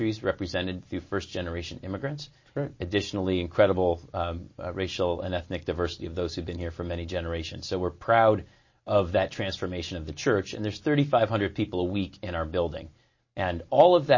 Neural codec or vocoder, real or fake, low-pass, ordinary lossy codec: none; real; 7.2 kHz; MP3, 32 kbps